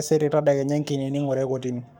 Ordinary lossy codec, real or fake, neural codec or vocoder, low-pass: none; fake; codec, 44.1 kHz, 7.8 kbps, Pupu-Codec; 19.8 kHz